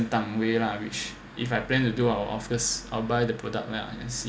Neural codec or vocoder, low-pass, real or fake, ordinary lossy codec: none; none; real; none